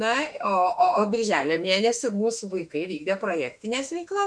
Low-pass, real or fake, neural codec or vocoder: 9.9 kHz; fake; autoencoder, 48 kHz, 32 numbers a frame, DAC-VAE, trained on Japanese speech